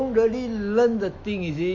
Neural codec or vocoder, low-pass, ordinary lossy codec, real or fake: autoencoder, 48 kHz, 128 numbers a frame, DAC-VAE, trained on Japanese speech; 7.2 kHz; MP3, 64 kbps; fake